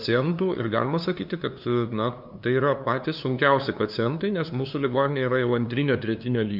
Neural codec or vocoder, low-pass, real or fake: codec, 16 kHz, 2 kbps, FunCodec, trained on LibriTTS, 25 frames a second; 5.4 kHz; fake